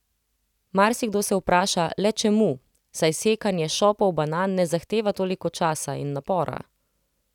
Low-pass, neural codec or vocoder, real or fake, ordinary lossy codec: 19.8 kHz; none; real; none